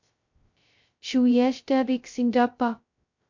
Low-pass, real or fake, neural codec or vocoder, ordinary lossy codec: 7.2 kHz; fake; codec, 16 kHz, 0.2 kbps, FocalCodec; MP3, 48 kbps